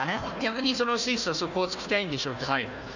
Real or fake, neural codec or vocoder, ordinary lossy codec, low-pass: fake; codec, 16 kHz, 1 kbps, FunCodec, trained on Chinese and English, 50 frames a second; none; 7.2 kHz